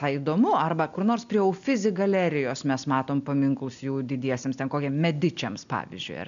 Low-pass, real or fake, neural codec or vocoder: 7.2 kHz; real; none